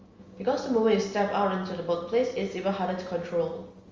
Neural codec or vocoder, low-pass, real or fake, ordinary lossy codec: none; 7.2 kHz; real; Opus, 32 kbps